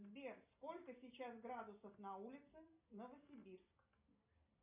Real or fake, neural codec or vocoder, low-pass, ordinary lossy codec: real; none; 3.6 kHz; MP3, 32 kbps